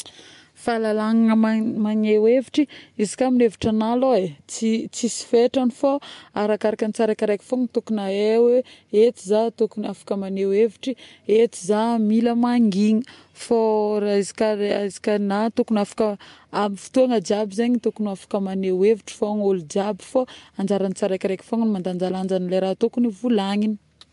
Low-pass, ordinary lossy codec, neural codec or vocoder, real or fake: 10.8 kHz; MP3, 64 kbps; none; real